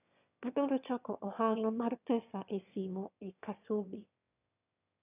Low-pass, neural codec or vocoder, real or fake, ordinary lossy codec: 3.6 kHz; autoencoder, 22.05 kHz, a latent of 192 numbers a frame, VITS, trained on one speaker; fake; none